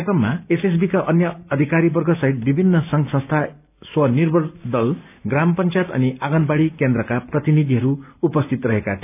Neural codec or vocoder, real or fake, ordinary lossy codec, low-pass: none; real; none; 3.6 kHz